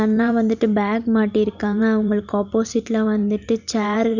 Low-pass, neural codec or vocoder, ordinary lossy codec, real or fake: 7.2 kHz; vocoder, 44.1 kHz, 128 mel bands every 512 samples, BigVGAN v2; none; fake